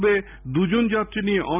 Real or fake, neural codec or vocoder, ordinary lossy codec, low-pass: real; none; none; 3.6 kHz